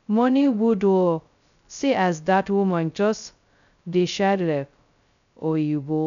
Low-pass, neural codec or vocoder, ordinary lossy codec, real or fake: 7.2 kHz; codec, 16 kHz, 0.2 kbps, FocalCodec; none; fake